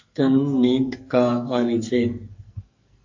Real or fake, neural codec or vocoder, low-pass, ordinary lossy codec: fake; codec, 44.1 kHz, 2.6 kbps, SNAC; 7.2 kHz; MP3, 48 kbps